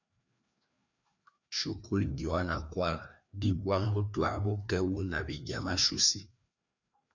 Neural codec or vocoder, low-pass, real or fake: codec, 16 kHz, 2 kbps, FreqCodec, larger model; 7.2 kHz; fake